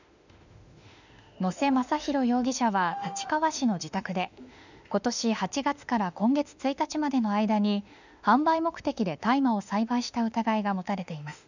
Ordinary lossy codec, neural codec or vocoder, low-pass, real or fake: none; autoencoder, 48 kHz, 32 numbers a frame, DAC-VAE, trained on Japanese speech; 7.2 kHz; fake